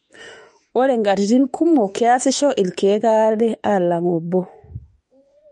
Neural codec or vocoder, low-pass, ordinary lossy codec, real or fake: autoencoder, 48 kHz, 32 numbers a frame, DAC-VAE, trained on Japanese speech; 19.8 kHz; MP3, 48 kbps; fake